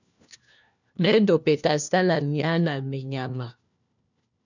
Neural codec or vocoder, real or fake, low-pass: codec, 16 kHz, 1 kbps, FunCodec, trained on LibriTTS, 50 frames a second; fake; 7.2 kHz